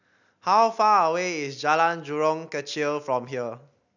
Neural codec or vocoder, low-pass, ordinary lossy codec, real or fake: none; 7.2 kHz; none; real